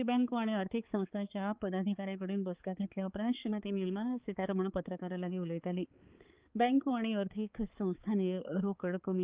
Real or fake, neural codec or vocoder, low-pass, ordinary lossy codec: fake; codec, 16 kHz, 4 kbps, X-Codec, HuBERT features, trained on balanced general audio; 3.6 kHz; Opus, 32 kbps